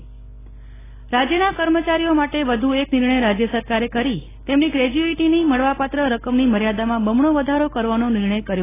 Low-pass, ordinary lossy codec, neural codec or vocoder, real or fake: 3.6 kHz; AAC, 16 kbps; none; real